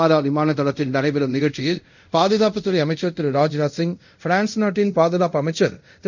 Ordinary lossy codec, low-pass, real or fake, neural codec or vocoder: none; 7.2 kHz; fake; codec, 24 kHz, 0.5 kbps, DualCodec